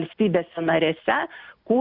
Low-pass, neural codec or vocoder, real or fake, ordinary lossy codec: 5.4 kHz; none; real; Opus, 64 kbps